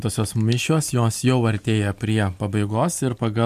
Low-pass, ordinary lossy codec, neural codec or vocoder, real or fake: 14.4 kHz; MP3, 96 kbps; none; real